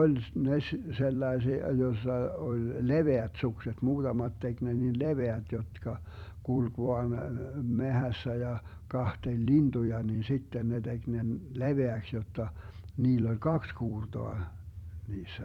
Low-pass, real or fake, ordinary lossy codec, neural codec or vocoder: 19.8 kHz; fake; none; vocoder, 44.1 kHz, 128 mel bands every 256 samples, BigVGAN v2